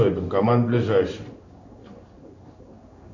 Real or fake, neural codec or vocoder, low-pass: fake; codec, 16 kHz in and 24 kHz out, 1 kbps, XY-Tokenizer; 7.2 kHz